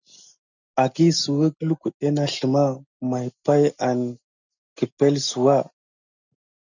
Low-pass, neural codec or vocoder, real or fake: 7.2 kHz; none; real